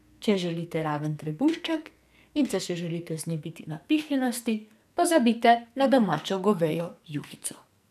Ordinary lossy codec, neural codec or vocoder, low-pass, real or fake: none; codec, 44.1 kHz, 2.6 kbps, SNAC; 14.4 kHz; fake